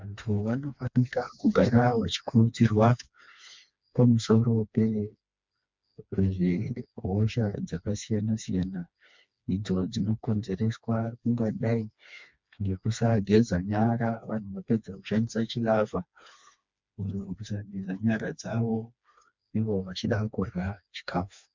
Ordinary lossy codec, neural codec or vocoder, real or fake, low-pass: MP3, 64 kbps; codec, 16 kHz, 2 kbps, FreqCodec, smaller model; fake; 7.2 kHz